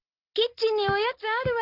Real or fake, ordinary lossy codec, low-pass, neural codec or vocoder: real; Opus, 24 kbps; 5.4 kHz; none